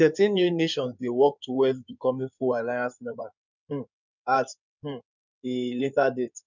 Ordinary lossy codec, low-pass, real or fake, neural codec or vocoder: none; 7.2 kHz; fake; codec, 16 kHz in and 24 kHz out, 2.2 kbps, FireRedTTS-2 codec